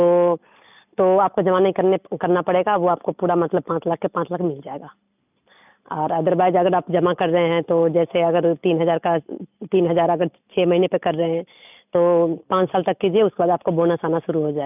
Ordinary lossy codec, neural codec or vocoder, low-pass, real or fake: none; none; 3.6 kHz; real